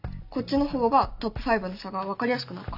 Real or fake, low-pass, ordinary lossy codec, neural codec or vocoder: real; 5.4 kHz; none; none